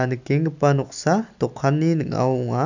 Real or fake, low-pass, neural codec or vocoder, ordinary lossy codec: real; 7.2 kHz; none; none